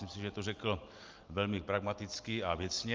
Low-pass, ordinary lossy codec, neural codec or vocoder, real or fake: 7.2 kHz; Opus, 32 kbps; none; real